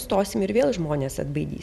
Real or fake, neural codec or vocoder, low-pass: fake; vocoder, 44.1 kHz, 128 mel bands every 256 samples, BigVGAN v2; 14.4 kHz